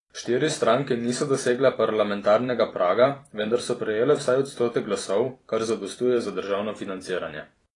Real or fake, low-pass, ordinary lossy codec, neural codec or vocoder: fake; 10.8 kHz; AAC, 32 kbps; vocoder, 44.1 kHz, 128 mel bands every 256 samples, BigVGAN v2